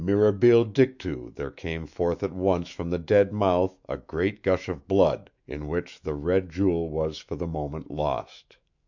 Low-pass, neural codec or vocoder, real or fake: 7.2 kHz; autoencoder, 48 kHz, 128 numbers a frame, DAC-VAE, trained on Japanese speech; fake